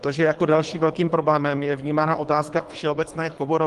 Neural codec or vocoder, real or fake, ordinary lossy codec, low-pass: codec, 24 kHz, 3 kbps, HILCodec; fake; Opus, 24 kbps; 10.8 kHz